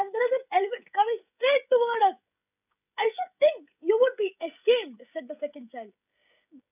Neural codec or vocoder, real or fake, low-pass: codec, 16 kHz, 16 kbps, FreqCodec, smaller model; fake; 3.6 kHz